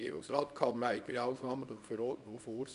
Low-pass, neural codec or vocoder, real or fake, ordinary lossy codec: 10.8 kHz; codec, 24 kHz, 0.9 kbps, WavTokenizer, small release; fake; none